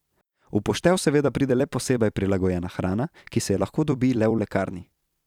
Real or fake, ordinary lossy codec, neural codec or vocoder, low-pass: fake; none; vocoder, 44.1 kHz, 128 mel bands every 256 samples, BigVGAN v2; 19.8 kHz